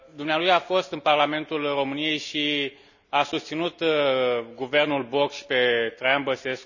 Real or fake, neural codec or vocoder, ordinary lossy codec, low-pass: real; none; none; 7.2 kHz